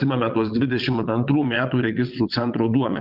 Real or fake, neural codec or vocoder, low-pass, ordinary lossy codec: fake; vocoder, 22.05 kHz, 80 mel bands, WaveNeXt; 5.4 kHz; Opus, 24 kbps